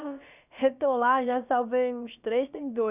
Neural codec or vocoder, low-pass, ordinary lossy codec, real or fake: codec, 16 kHz, about 1 kbps, DyCAST, with the encoder's durations; 3.6 kHz; none; fake